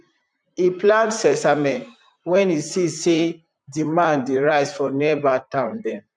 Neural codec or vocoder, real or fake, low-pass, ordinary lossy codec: vocoder, 44.1 kHz, 128 mel bands, Pupu-Vocoder; fake; 9.9 kHz; none